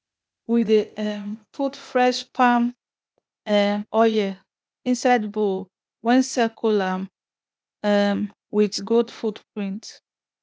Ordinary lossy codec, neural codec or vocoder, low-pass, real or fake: none; codec, 16 kHz, 0.8 kbps, ZipCodec; none; fake